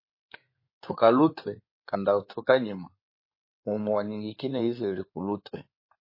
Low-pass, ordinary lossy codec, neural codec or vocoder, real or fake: 5.4 kHz; MP3, 24 kbps; codec, 16 kHz in and 24 kHz out, 2.2 kbps, FireRedTTS-2 codec; fake